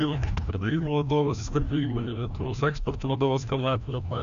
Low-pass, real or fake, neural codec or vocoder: 7.2 kHz; fake; codec, 16 kHz, 1 kbps, FreqCodec, larger model